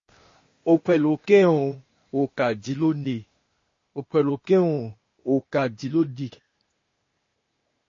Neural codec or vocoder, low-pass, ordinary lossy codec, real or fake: codec, 16 kHz, 0.8 kbps, ZipCodec; 7.2 kHz; MP3, 32 kbps; fake